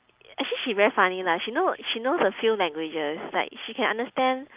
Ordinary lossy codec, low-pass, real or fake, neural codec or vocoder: Opus, 24 kbps; 3.6 kHz; real; none